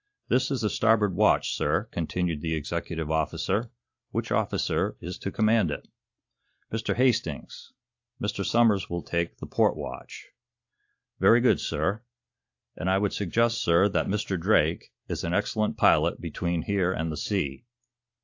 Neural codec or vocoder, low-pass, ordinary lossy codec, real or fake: none; 7.2 kHz; AAC, 48 kbps; real